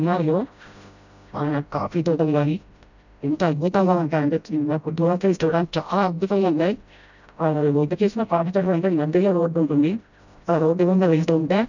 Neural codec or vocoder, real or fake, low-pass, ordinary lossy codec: codec, 16 kHz, 0.5 kbps, FreqCodec, smaller model; fake; 7.2 kHz; none